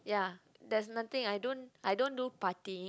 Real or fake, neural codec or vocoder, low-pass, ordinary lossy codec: real; none; none; none